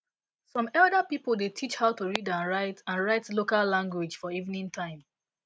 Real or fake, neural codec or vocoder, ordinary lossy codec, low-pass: real; none; none; none